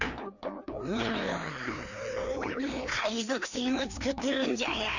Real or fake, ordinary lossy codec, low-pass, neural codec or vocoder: fake; none; 7.2 kHz; codec, 16 kHz, 2 kbps, FreqCodec, larger model